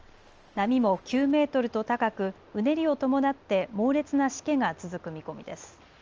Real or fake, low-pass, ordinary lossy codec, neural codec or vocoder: real; 7.2 kHz; Opus, 24 kbps; none